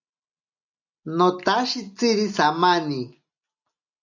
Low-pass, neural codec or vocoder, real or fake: 7.2 kHz; none; real